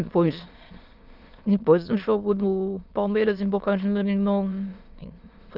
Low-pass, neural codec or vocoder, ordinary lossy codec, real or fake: 5.4 kHz; autoencoder, 22.05 kHz, a latent of 192 numbers a frame, VITS, trained on many speakers; Opus, 24 kbps; fake